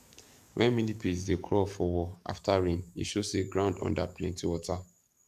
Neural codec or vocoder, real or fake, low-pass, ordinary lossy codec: codec, 44.1 kHz, 7.8 kbps, DAC; fake; 14.4 kHz; none